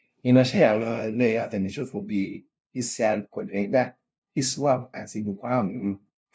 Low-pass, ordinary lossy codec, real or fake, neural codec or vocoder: none; none; fake; codec, 16 kHz, 0.5 kbps, FunCodec, trained on LibriTTS, 25 frames a second